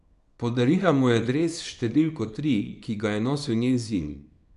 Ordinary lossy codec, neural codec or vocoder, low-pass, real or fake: none; codec, 24 kHz, 0.9 kbps, WavTokenizer, small release; 10.8 kHz; fake